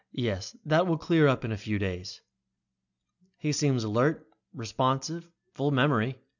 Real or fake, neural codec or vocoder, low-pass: real; none; 7.2 kHz